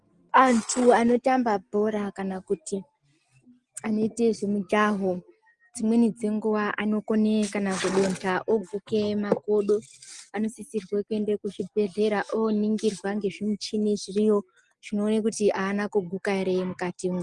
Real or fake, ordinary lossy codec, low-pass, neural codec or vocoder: real; Opus, 24 kbps; 10.8 kHz; none